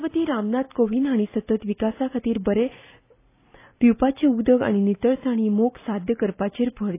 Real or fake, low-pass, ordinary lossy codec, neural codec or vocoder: real; 3.6 kHz; AAC, 24 kbps; none